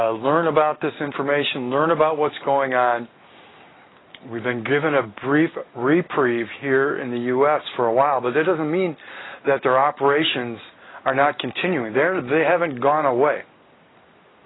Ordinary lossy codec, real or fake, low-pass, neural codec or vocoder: AAC, 16 kbps; real; 7.2 kHz; none